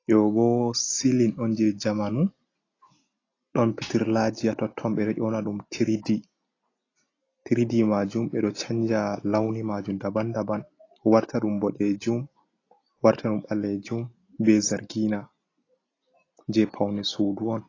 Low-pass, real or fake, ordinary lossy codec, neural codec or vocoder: 7.2 kHz; real; AAC, 32 kbps; none